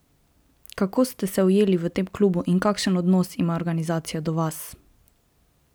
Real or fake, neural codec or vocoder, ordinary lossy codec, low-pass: real; none; none; none